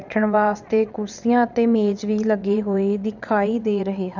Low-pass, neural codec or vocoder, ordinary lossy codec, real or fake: 7.2 kHz; vocoder, 22.05 kHz, 80 mel bands, WaveNeXt; none; fake